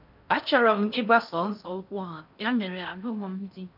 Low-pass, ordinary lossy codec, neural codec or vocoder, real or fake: 5.4 kHz; none; codec, 16 kHz in and 24 kHz out, 0.6 kbps, FocalCodec, streaming, 4096 codes; fake